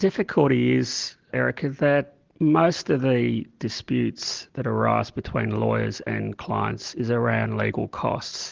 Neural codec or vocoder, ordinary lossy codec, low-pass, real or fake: none; Opus, 16 kbps; 7.2 kHz; real